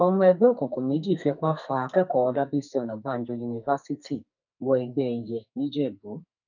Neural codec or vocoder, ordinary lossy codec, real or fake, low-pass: codec, 44.1 kHz, 2.6 kbps, SNAC; none; fake; 7.2 kHz